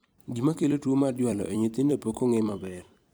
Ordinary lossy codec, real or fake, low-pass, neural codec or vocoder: none; fake; none; vocoder, 44.1 kHz, 128 mel bands every 256 samples, BigVGAN v2